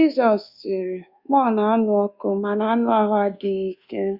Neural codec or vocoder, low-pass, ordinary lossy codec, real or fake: codec, 24 kHz, 1.2 kbps, DualCodec; 5.4 kHz; Opus, 64 kbps; fake